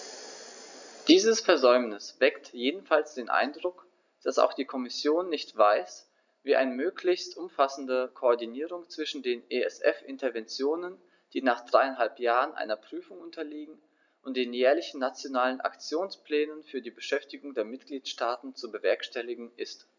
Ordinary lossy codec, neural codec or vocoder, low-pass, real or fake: none; none; 7.2 kHz; real